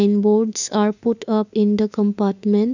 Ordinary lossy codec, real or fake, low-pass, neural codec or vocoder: none; fake; 7.2 kHz; autoencoder, 48 kHz, 32 numbers a frame, DAC-VAE, trained on Japanese speech